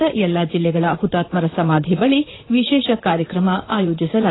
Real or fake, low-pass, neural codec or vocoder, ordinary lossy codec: fake; 7.2 kHz; vocoder, 44.1 kHz, 128 mel bands, Pupu-Vocoder; AAC, 16 kbps